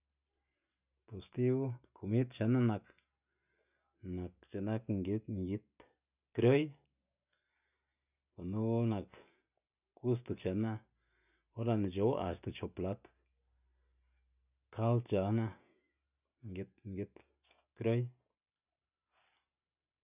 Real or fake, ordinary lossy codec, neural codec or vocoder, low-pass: real; none; none; 3.6 kHz